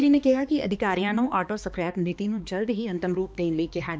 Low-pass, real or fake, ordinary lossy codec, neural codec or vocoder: none; fake; none; codec, 16 kHz, 2 kbps, X-Codec, HuBERT features, trained on balanced general audio